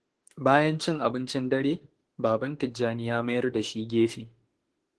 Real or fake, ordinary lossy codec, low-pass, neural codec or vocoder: fake; Opus, 16 kbps; 10.8 kHz; autoencoder, 48 kHz, 32 numbers a frame, DAC-VAE, trained on Japanese speech